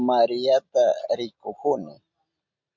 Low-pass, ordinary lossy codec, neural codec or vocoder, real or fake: 7.2 kHz; MP3, 64 kbps; none; real